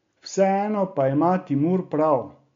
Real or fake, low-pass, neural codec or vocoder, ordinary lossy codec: real; 7.2 kHz; none; MP3, 48 kbps